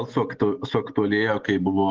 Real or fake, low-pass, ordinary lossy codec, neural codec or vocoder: real; 7.2 kHz; Opus, 16 kbps; none